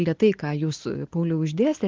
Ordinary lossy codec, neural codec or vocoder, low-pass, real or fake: Opus, 16 kbps; none; 7.2 kHz; real